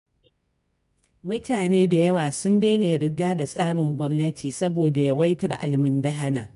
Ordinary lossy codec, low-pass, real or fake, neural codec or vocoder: none; 10.8 kHz; fake; codec, 24 kHz, 0.9 kbps, WavTokenizer, medium music audio release